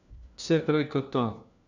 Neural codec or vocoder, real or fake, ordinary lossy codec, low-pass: codec, 16 kHz, 1 kbps, FunCodec, trained on LibriTTS, 50 frames a second; fake; none; 7.2 kHz